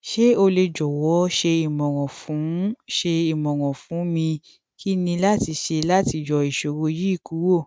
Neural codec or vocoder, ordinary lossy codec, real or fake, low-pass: none; none; real; none